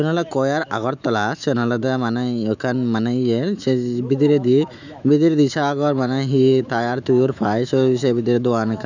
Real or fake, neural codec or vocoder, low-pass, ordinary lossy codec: fake; autoencoder, 48 kHz, 128 numbers a frame, DAC-VAE, trained on Japanese speech; 7.2 kHz; none